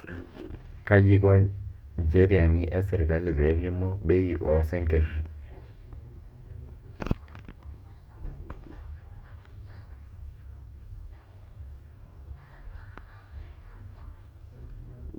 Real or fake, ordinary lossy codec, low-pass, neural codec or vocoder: fake; MP3, 96 kbps; 19.8 kHz; codec, 44.1 kHz, 2.6 kbps, DAC